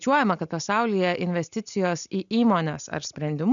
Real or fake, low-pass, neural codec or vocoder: real; 7.2 kHz; none